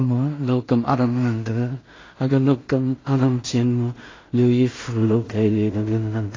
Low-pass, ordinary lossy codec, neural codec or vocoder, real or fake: 7.2 kHz; AAC, 32 kbps; codec, 16 kHz in and 24 kHz out, 0.4 kbps, LongCat-Audio-Codec, two codebook decoder; fake